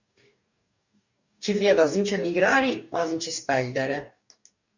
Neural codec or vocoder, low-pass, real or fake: codec, 44.1 kHz, 2.6 kbps, DAC; 7.2 kHz; fake